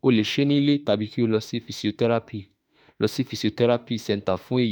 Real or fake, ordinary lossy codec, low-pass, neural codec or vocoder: fake; none; none; autoencoder, 48 kHz, 32 numbers a frame, DAC-VAE, trained on Japanese speech